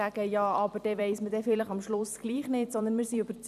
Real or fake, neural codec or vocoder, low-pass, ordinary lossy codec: fake; vocoder, 44.1 kHz, 128 mel bands every 256 samples, BigVGAN v2; 14.4 kHz; none